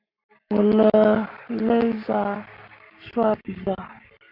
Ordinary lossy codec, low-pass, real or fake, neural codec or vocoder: AAC, 48 kbps; 5.4 kHz; fake; codec, 44.1 kHz, 7.8 kbps, Pupu-Codec